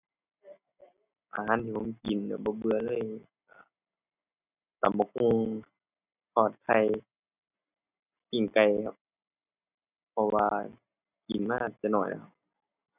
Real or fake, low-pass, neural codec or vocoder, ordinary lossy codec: real; 3.6 kHz; none; none